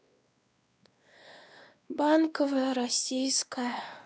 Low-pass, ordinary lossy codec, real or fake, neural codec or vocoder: none; none; fake; codec, 16 kHz, 2 kbps, X-Codec, WavLM features, trained on Multilingual LibriSpeech